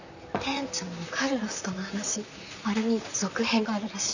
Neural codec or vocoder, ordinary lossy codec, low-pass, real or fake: vocoder, 44.1 kHz, 128 mel bands, Pupu-Vocoder; none; 7.2 kHz; fake